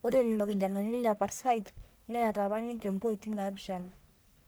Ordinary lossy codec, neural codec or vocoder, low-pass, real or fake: none; codec, 44.1 kHz, 1.7 kbps, Pupu-Codec; none; fake